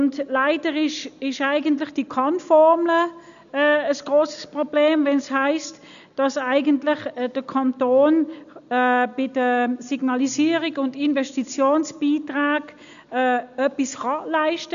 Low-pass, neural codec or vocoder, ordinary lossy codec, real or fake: 7.2 kHz; none; none; real